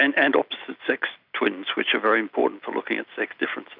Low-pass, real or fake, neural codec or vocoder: 5.4 kHz; real; none